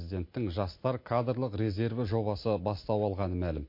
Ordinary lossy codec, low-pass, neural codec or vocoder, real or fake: MP3, 32 kbps; 5.4 kHz; none; real